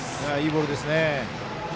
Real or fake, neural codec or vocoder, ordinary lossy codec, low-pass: real; none; none; none